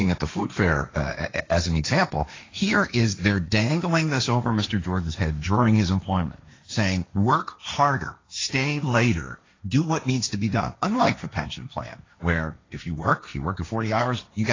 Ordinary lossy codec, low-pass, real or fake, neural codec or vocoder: AAC, 32 kbps; 7.2 kHz; fake; codec, 16 kHz, 1.1 kbps, Voila-Tokenizer